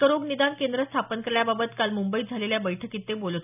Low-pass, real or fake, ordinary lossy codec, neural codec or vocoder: 3.6 kHz; real; none; none